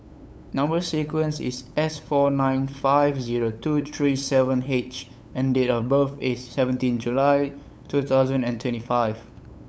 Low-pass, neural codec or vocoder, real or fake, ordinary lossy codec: none; codec, 16 kHz, 8 kbps, FunCodec, trained on LibriTTS, 25 frames a second; fake; none